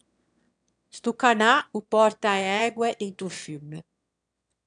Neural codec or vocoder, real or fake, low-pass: autoencoder, 22.05 kHz, a latent of 192 numbers a frame, VITS, trained on one speaker; fake; 9.9 kHz